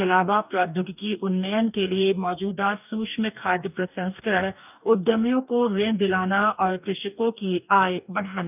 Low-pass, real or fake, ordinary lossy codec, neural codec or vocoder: 3.6 kHz; fake; none; codec, 44.1 kHz, 2.6 kbps, DAC